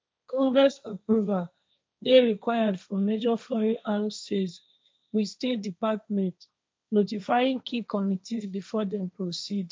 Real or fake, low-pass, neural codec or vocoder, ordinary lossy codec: fake; none; codec, 16 kHz, 1.1 kbps, Voila-Tokenizer; none